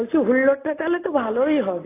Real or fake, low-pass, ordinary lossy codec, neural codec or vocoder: real; 3.6 kHz; AAC, 16 kbps; none